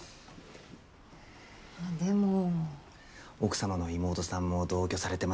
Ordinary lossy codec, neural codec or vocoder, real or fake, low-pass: none; none; real; none